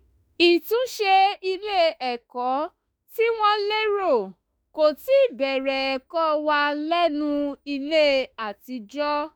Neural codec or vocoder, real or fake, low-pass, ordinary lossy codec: autoencoder, 48 kHz, 32 numbers a frame, DAC-VAE, trained on Japanese speech; fake; none; none